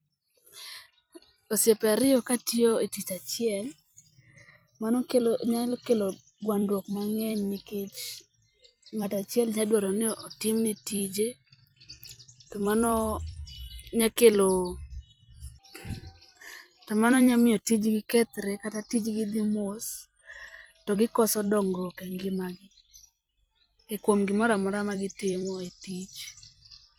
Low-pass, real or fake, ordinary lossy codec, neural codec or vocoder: none; fake; none; vocoder, 44.1 kHz, 128 mel bands every 256 samples, BigVGAN v2